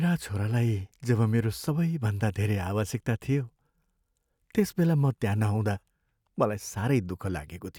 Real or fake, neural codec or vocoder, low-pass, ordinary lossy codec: real; none; 19.8 kHz; none